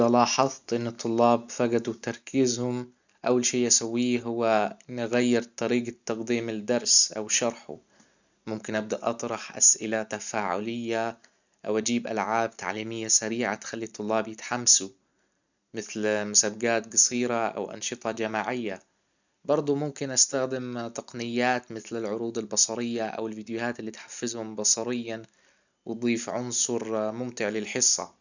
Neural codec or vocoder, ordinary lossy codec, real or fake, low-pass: none; none; real; 7.2 kHz